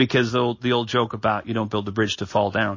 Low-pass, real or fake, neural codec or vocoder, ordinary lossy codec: 7.2 kHz; real; none; MP3, 32 kbps